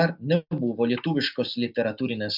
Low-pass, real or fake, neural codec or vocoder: 5.4 kHz; real; none